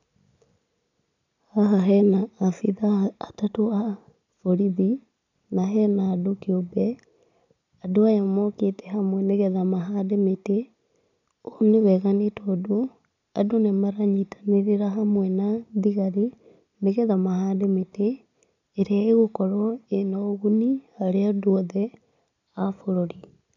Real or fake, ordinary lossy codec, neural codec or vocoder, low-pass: real; none; none; 7.2 kHz